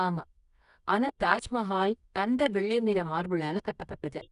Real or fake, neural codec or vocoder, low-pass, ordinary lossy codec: fake; codec, 24 kHz, 0.9 kbps, WavTokenizer, medium music audio release; 10.8 kHz; none